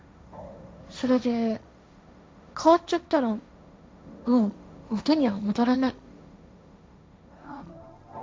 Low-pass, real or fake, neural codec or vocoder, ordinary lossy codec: none; fake; codec, 16 kHz, 1.1 kbps, Voila-Tokenizer; none